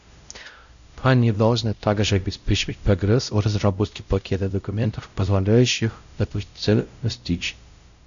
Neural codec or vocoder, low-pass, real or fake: codec, 16 kHz, 0.5 kbps, X-Codec, WavLM features, trained on Multilingual LibriSpeech; 7.2 kHz; fake